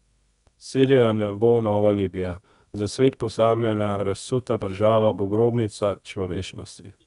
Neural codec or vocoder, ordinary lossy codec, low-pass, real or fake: codec, 24 kHz, 0.9 kbps, WavTokenizer, medium music audio release; none; 10.8 kHz; fake